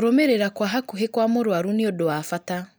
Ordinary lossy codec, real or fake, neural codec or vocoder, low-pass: none; real; none; none